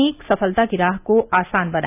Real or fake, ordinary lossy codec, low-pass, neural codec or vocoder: real; AAC, 24 kbps; 3.6 kHz; none